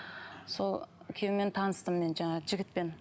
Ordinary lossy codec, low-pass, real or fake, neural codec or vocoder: none; none; real; none